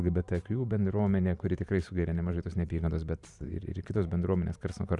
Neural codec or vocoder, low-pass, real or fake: vocoder, 44.1 kHz, 128 mel bands every 512 samples, BigVGAN v2; 10.8 kHz; fake